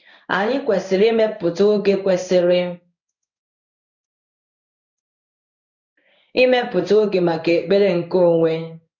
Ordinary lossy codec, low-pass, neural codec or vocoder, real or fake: none; 7.2 kHz; codec, 16 kHz in and 24 kHz out, 1 kbps, XY-Tokenizer; fake